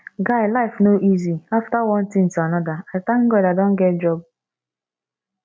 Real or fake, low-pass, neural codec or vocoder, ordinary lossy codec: real; none; none; none